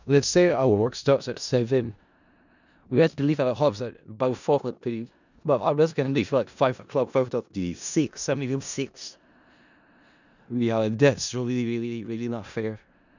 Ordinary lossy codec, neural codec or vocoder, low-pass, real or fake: none; codec, 16 kHz in and 24 kHz out, 0.4 kbps, LongCat-Audio-Codec, four codebook decoder; 7.2 kHz; fake